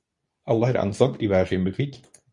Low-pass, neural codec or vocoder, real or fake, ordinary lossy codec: 10.8 kHz; codec, 24 kHz, 0.9 kbps, WavTokenizer, medium speech release version 2; fake; MP3, 48 kbps